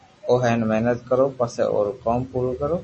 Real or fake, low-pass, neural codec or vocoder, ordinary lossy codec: real; 10.8 kHz; none; MP3, 32 kbps